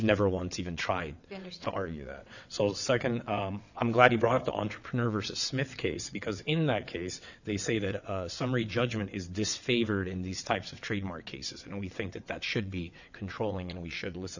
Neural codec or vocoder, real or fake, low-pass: vocoder, 22.05 kHz, 80 mel bands, WaveNeXt; fake; 7.2 kHz